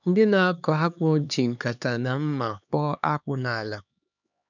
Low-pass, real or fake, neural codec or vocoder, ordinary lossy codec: 7.2 kHz; fake; codec, 16 kHz, 2 kbps, X-Codec, HuBERT features, trained on LibriSpeech; none